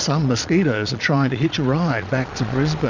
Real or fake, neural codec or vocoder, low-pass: fake; vocoder, 22.05 kHz, 80 mel bands, Vocos; 7.2 kHz